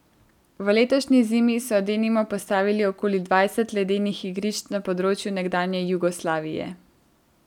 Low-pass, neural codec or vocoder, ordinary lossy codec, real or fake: 19.8 kHz; none; none; real